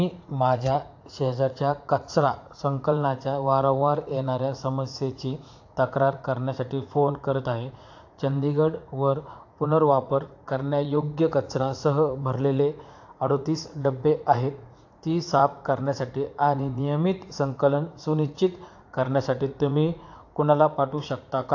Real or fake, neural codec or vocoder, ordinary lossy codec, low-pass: fake; vocoder, 44.1 kHz, 80 mel bands, Vocos; AAC, 48 kbps; 7.2 kHz